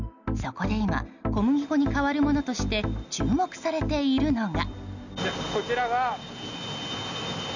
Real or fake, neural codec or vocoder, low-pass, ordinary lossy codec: real; none; 7.2 kHz; none